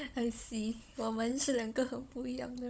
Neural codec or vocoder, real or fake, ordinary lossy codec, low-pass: codec, 16 kHz, 16 kbps, FunCodec, trained on Chinese and English, 50 frames a second; fake; none; none